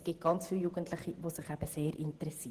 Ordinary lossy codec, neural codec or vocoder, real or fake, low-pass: Opus, 16 kbps; vocoder, 48 kHz, 128 mel bands, Vocos; fake; 14.4 kHz